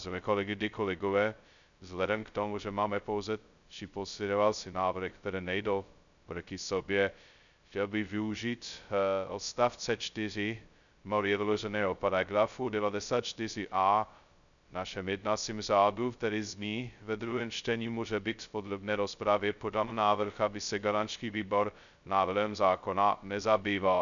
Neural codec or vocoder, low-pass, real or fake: codec, 16 kHz, 0.2 kbps, FocalCodec; 7.2 kHz; fake